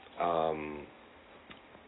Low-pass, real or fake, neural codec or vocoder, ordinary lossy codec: 7.2 kHz; real; none; AAC, 16 kbps